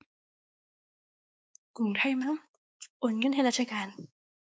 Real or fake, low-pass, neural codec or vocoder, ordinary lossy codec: fake; none; codec, 16 kHz, 4 kbps, X-Codec, WavLM features, trained on Multilingual LibriSpeech; none